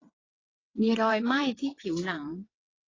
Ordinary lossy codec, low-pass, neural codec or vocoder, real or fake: AAC, 32 kbps; 7.2 kHz; vocoder, 22.05 kHz, 80 mel bands, WaveNeXt; fake